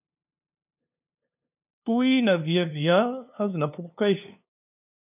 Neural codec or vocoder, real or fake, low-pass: codec, 16 kHz, 2 kbps, FunCodec, trained on LibriTTS, 25 frames a second; fake; 3.6 kHz